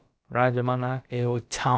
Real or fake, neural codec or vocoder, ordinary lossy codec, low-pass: fake; codec, 16 kHz, about 1 kbps, DyCAST, with the encoder's durations; none; none